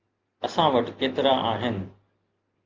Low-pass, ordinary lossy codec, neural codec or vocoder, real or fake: 7.2 kHz; Opus, 24 kbps; none; real